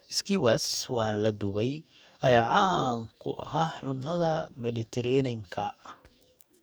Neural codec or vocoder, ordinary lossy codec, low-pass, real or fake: codec, 44.1 kHz, 2.6 kbps, DAC; none; none; fake